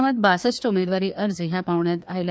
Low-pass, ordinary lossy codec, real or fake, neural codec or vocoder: none; none; fake; codec, 16 kHz, 2 kbps, FreqCodec, larger model